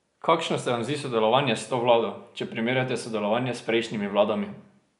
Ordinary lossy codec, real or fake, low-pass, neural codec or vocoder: none; real; 10.8 kHz; none